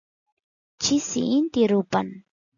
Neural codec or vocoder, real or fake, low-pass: none; real; 7.2 kHz